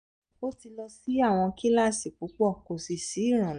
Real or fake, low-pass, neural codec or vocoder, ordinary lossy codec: real; 9.9 kHz; none; none